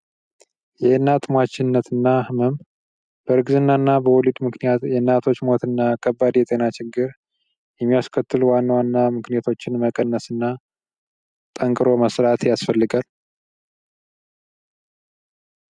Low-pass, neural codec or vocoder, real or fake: 9.9 kHz; none; real